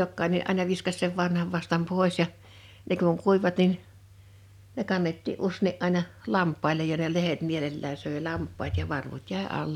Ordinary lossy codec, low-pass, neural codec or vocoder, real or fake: none; 19.8 kHz; none; real